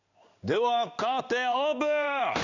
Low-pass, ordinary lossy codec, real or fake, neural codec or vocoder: 7.2 kHz; none; fake; codec, 16 kHz in and 24 kHz out, 1 kbps, XY-Tokenizer